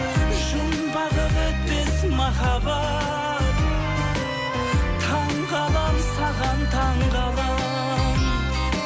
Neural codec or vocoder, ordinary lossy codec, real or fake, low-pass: none; none; real; none